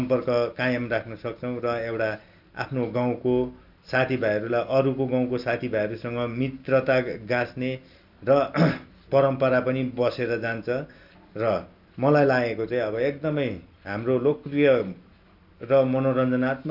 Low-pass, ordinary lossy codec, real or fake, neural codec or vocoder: 5.4 kHz; none; real; none